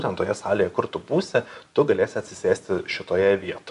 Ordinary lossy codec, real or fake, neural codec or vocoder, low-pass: MP3, 64 kbps; real; none; 10.8 kHz